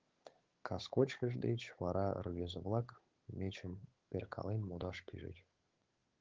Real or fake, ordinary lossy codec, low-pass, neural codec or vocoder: fake; Opus, 16 kbps; 7.2 kHz; codec, 16 kHz, 8 kbps, FunCodec, trained on Chinese and English, 25 frames a second